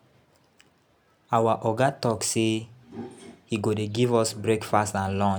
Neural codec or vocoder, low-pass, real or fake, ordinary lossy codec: none; none; real; none